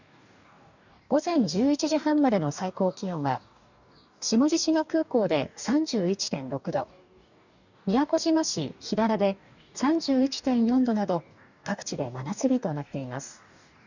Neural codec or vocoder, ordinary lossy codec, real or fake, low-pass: codec, 44.1 kHz, 2.6 kbps, DAC; none; fake; 7.2 kHz